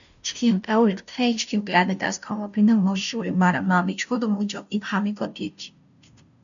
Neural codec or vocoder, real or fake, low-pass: codec, 16 kHz, 0.5 kbps, FunCodec, trained on Chinese and English, 25 frames a second; fake; 7.2 kHz